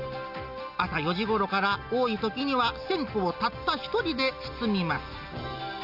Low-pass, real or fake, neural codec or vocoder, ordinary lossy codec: 5.4 kHz; real; none; none